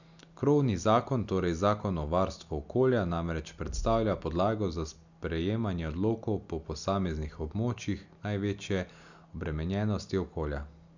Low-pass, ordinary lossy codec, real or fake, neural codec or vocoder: 7.2 kHz; none; real; none